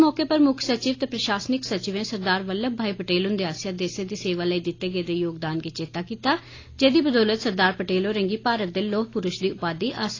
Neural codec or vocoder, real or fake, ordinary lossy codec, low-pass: none; real; AAC, 32 kbps; 7.2 kHz